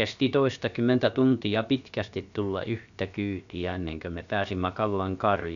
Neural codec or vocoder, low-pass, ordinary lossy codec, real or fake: codec, 16 kHz, about 1 kbps, DyCAST, with the encoder's durations; 7.2 kHz; none; fake